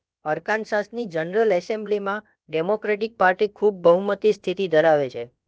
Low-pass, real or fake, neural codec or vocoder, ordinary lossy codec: none; fake; codec, 16 kHz, about 1 kbps, DyCAST, with the encoder's durations; none